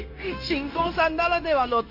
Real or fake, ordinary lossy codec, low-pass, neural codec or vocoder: fake; AAC, 32 kbps; 5.4 kHz; codec, 16 kHz, 0.9 kbps, LongCat-Audio-Codec